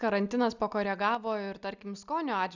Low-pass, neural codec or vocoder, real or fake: 7.2 kHz; none; real